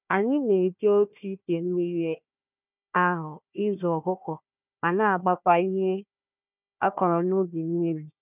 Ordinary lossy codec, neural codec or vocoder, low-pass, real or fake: none; codec, 16 kHz, 1 kbps, FunCodec, trained on Chinese and English, 50 frames a second; 3.6 kHz; fake